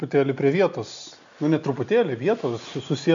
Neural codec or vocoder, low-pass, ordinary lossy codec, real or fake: none; 7.2 kHz; MP3, 64 kbps; real